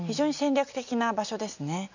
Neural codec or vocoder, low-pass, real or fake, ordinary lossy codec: none; 7.2 kHz; real; none